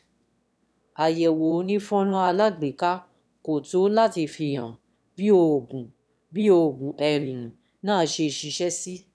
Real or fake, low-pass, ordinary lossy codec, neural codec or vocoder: fake; none; none; autoencoder, 22.05 kHz, a latent of 192 numbers a frame, VITS, trained on one speaker